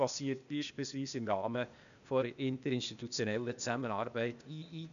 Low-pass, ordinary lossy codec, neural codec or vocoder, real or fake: 7.2 kHz; none; codec, 16 kHz, 0.8 kbps, ZipCodec; fake